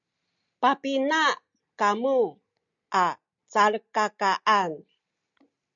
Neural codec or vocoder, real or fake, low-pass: none; real; 7.2 kHz